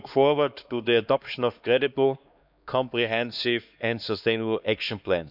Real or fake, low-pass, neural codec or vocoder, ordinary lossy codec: fake; 5.4 kHz; codec, 16 kHz, 2 kbps, X-Codec, HuBERT features, trained on LibriSpeech; none